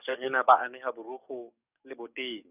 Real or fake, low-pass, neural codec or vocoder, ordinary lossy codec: fake; 3.6 kHz; codec, 44.1 kHz, 7.8 kbps, DAC; none